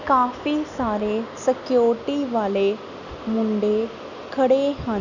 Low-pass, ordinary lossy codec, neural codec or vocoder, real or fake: 7.2 kHz; none; none; real